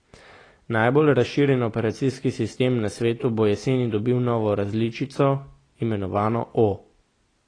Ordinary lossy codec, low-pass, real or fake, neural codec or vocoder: AAC, 32 kbps; 9.9 kHz; real; none